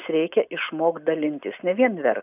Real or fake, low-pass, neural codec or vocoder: real; 3.6 kHz; none